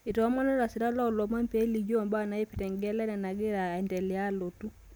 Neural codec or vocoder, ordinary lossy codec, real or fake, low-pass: none; none; real; none